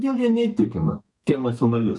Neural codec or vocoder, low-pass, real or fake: codec, 32 kHz, 1.9 kbps, SNAC; 10.8 kHz; fake